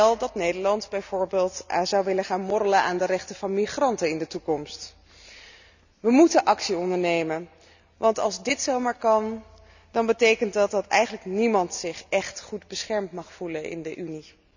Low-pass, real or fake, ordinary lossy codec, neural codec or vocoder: 7.2 kHz; real; none; none